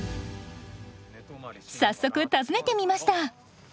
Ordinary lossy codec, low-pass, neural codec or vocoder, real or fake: none; none; none; real